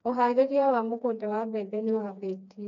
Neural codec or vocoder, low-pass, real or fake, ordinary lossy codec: codec, 16 kHz, 2 kbps, FreqCodec, smaller model; 7.2 kHz; fake; none